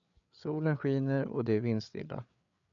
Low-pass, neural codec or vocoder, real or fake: 7.2 kHz; codec, 16 kHz, 8 kbps, FreqCodec, larger model; fake